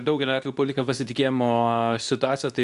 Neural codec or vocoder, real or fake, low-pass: codec, 24 kHz, 0.9 kbps, WavTokenizer, medium speech release version 2; fake; 10.8 kHz